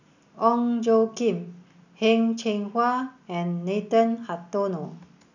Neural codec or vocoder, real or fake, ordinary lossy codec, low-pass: none; real; none; 7.2 kHz